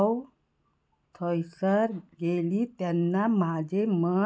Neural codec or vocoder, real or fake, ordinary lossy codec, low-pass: none; real; none; none